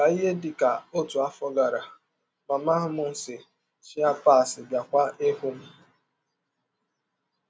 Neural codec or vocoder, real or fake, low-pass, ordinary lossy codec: none; real; none; none